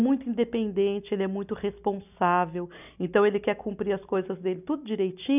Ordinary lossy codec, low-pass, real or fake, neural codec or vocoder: none; 3.6 kHz; real; none